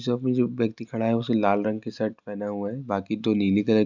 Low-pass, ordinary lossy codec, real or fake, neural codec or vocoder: 7.2 kHz; none; real; none